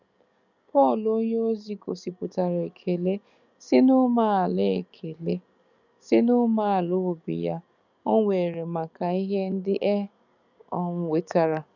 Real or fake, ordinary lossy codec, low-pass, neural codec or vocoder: fake; none; 7.2 kHz; codec, 44.1 kHz, 7.8 kbps, DAC